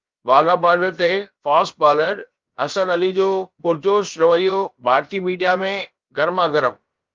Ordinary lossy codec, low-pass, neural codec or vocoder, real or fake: Opus, 32 kbps; 7.2 kHz; codec, 16 kHz, about 1 kbps, DyCAST, with the encoder's durations; fake